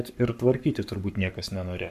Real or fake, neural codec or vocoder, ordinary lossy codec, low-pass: fake; codec, 44.1 kHz, 7.8 kbps, Pupu-Codec; MP3, 96 kbps; 14.4 kHz